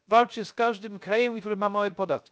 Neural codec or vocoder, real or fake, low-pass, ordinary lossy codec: codec, 16 kHz, 0.3 kbps, FocalCodec; fake; none; none